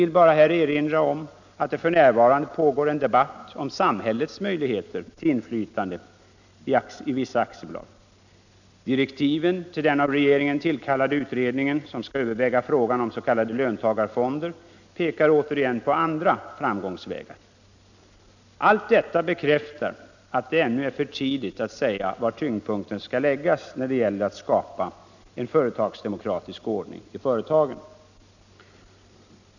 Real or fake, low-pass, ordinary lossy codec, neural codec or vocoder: real; 7.2 kHz; none; none